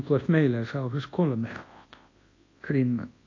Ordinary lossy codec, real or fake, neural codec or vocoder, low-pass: AAC, 32 kbps; fake; codec, 24 kHz, 0.9 kbps, WavTokenizer, large speech release; 7.2 kHz